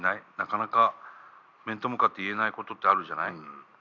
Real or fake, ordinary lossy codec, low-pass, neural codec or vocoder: real; none; 7.2 kHz; none